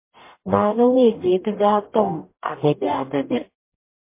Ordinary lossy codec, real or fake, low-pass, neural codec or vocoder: MP3, 24 kbps; fake; 3.6 kHz; codec, 44.1 kHz, 0.9 kbps, DAC